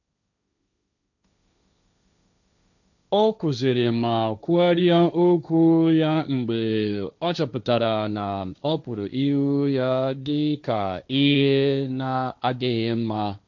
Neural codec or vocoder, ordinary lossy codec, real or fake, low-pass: codec, 16 kHz, 1.1 kbps, Voila-Tokenizer; none; fake; 7.2 kHz